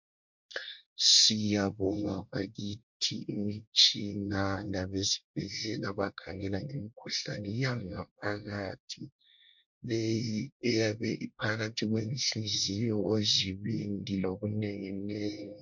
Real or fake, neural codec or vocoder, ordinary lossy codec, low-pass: fake; codec, 24 kHz, 1 kbps, SNAC; MP3, 48 kbps; 7.2 kHz